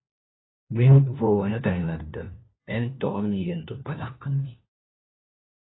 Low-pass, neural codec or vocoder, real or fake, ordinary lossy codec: 7.2 kHz; codec, 16 kHz, 1 kbps, FunCodec, trained on LibriTTS, 50 frames a second; fake; AAC, 16 kbps